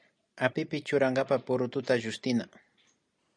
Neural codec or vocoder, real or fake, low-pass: none; real; 9.9 kHz